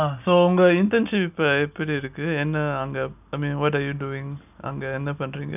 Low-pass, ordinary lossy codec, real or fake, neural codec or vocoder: 3.6 kHz; none; real; none